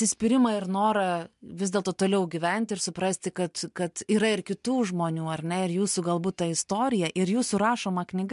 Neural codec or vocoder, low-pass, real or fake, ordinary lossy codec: none; 10.8 kHz; real; MP3, 64 kbps